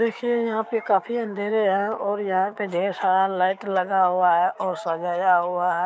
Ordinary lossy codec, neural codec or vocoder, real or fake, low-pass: none; codec, 16 kHz, 6 kbps, DAC; fake; none